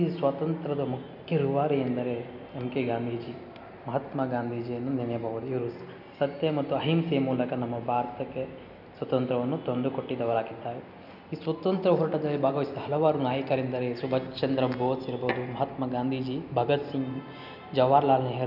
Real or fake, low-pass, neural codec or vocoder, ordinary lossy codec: real; 5.4 kHz; none; none